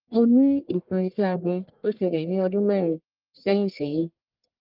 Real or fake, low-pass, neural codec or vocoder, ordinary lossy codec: fake; 5.4 kHz; codec, 44.1 kHz, 1.7 kbps, Pupu-Codec; Opus, 32 kbps